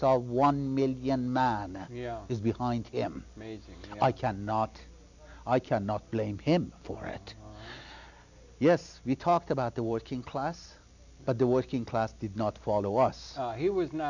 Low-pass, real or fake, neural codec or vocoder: 7.2 kHz; real; none